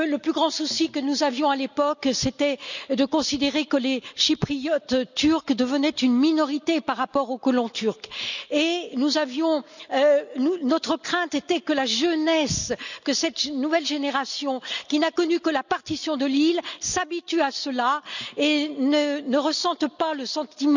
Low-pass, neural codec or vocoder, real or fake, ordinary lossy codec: 7.2 kHz; none; real; none